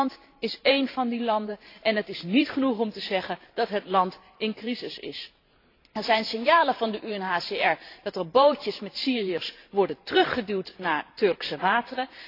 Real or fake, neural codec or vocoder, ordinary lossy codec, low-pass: fake; vocoder, 44.1 kHz, 128 mel bands every 512 samples, BigVGAN v2; AAC, 32 kbps; 5.4 kHz